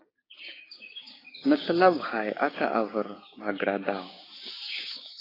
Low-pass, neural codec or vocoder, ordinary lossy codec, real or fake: 5.4 kHz; codec, 16 kHz, 6 kbps, DAC; AAC, 24 kbps; fake